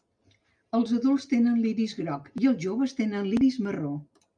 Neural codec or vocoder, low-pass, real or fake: none; 9.9 kHz; real